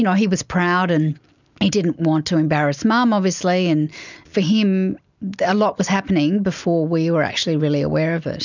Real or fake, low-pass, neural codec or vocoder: real; 7.2 kHz; none